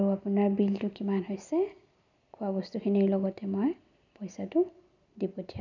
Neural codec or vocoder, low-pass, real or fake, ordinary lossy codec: none; 7.2 kHz; real; none